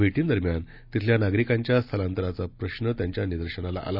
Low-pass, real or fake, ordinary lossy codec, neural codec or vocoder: 5.4 kHz; real; none; none